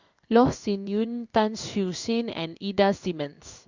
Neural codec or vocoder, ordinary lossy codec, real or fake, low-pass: codec, 16 kHz in and 24 kHz out, 1 kbps, XY-Tokenizer; Opus, 64 kbps; fake; 7.2 kHz